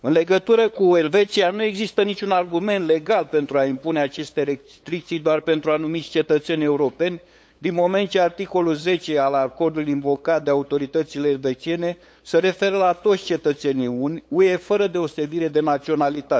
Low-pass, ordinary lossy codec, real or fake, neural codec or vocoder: none; none; fake; codec, 16 kHz, 8 kbps, FunCodec, trained on LibriTTS, 25 frames a second